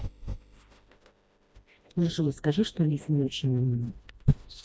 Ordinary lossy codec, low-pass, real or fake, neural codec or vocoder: none; none; fake; codec, 16 kHz, 1 kbps, FreqCodec, smaller model